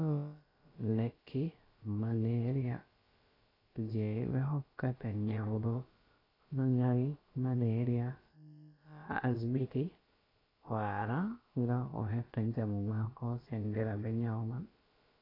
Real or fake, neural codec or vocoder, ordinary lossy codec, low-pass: fake; codec, 16 kHz, about 1 kbps, DyCAST, with the encoder's durations; AAC, 24 kbps; 5.4 kHz